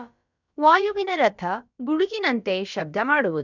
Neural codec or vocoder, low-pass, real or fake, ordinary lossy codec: codec, 16 kHz, about 1 kbps, DyCAST, with the encoder's durations; 7.2 kHz; fake; none